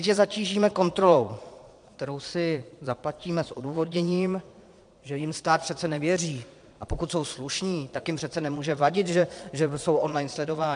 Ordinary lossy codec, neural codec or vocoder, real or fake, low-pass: MP3, 64 kbps; vocoder, 22.05 kHz, 80 mel bands, Vocos; fake; 9.9 kHz